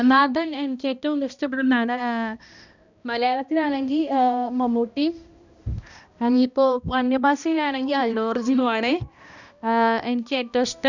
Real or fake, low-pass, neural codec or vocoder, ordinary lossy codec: fake; 7.2 kHz; codec, 16 kHz, 1 kbps, X-Codec, HuBERT features, trained on balanced general audio; none